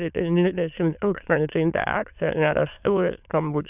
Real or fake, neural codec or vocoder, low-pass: fake; autoencoder, 22.05 kHz, a latent of 192 numbers a frame, VITS, trained on many speakers; 3.6 kHz